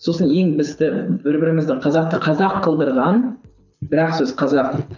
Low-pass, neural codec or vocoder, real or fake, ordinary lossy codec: 7.2 kHz; codec, 24 kHz, 6 kbps, HILCodec; fake; none